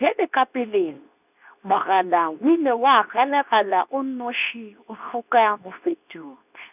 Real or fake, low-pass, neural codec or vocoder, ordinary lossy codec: fake; 3.6 kHz; codec, 24 kHz, 0.9 kbps, WavTokenizer, medium speech release version 2; none